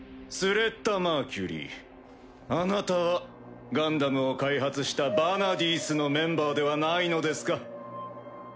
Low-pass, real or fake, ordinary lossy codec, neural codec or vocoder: none; real; none; none